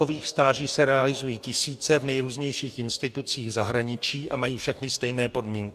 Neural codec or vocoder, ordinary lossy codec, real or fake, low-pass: codec, 44.1 kHz, 2.6 kbps, DAC; AAC, 96 kbps; fake; 14.4 kHz